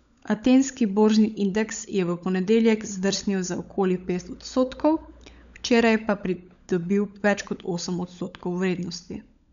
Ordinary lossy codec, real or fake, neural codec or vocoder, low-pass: none; fake; codec, 16 kHz, 16 kbps, FunCodec, trained on LibriTTS, 50 frames a second; 7.2 kHz